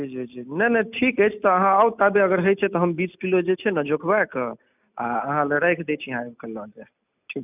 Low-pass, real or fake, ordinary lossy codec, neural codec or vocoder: 3.6 kHz; real; none; none